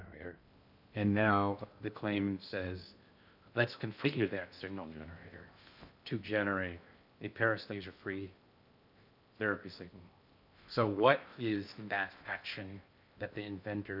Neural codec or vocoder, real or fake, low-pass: codec, 16 kHz in and 24 kHz out, 0.6 kbps, FocalCodec, streaming, 2048 codes; fake; 5.4 kHz